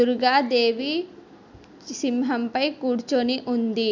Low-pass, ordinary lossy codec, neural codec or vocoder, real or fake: 7.2 kHz; none; none; real